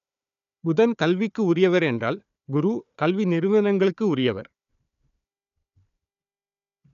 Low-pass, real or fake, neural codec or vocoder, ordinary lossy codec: 7.2 kHz; fake; codec, 16 kHz, 4 kbps, FunCodec, trained on Chinese and English, 50 frames a second; none